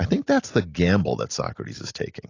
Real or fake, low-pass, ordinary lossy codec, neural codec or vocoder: real; 7.2 kHz; AAC, 32 kbps; none